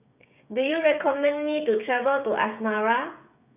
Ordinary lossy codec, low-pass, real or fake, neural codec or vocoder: none; 3.6 kHz; fake; codec, 16 kHz, 8 kbps, FreqCodec, smaller model